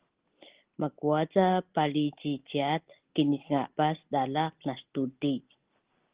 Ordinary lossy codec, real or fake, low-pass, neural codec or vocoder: Opus, 16 kbps; real; 3.6 kHz; none